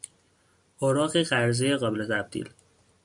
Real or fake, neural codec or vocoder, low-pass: real; none; 10.8 kHz